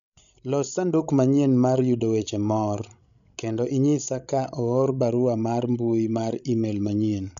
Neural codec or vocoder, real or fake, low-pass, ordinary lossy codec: codec, 16 kHz, 16 kbps, FreqCodec, larger model; fake; 7.2 kHz; none